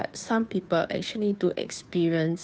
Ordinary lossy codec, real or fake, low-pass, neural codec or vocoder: none; fake; none; codec, 16 kHz, 2 kbps, FunCodec, trained on Chinese and English, 25 frames a second